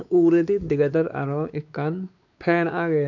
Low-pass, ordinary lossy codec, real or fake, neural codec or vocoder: 7.2 kHz; none; fake; codec, 16 kHz, 4 kbps, X-Codec, WavLM features, trained on Multilingual LibriSpeech